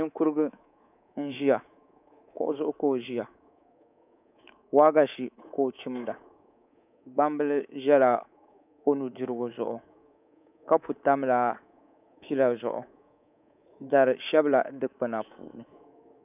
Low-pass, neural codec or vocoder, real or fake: 3.6 kHz; codec, 24 kHz, 3.1 kbps, DualCodec; fake